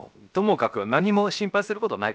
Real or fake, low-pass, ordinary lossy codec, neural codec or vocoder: fake; none; none; codec, 16 kHz, 0.7 kbps, FocalCodec